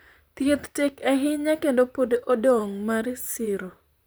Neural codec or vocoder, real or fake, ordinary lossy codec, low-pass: vocoder, 44.1 kHz, 128 mel bands, Pupu-Vocoder; fake; none; none